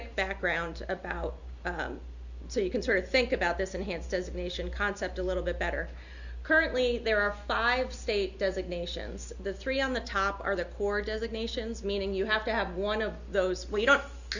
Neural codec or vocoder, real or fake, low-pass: none; real; 7.2 kHz